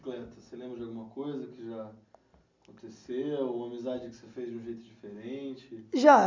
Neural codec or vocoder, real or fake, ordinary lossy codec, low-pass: none; real; MP3, 64 kbps; 7.2 kHz